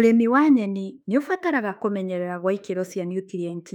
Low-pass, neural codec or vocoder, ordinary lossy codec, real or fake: 19.8 kHz; autoencoder, 48 kHz, 32 numbers a frame, DAC-VAE, trained on Japanese speech; none; fake